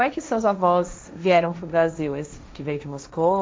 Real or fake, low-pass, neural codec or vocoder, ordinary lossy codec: fake; none; codec, 16 kHz, 1.1 kbps, Voila-Tokenizer; none